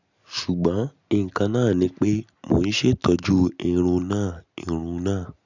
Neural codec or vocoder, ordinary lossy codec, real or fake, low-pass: none; none; real; 7.2 kHz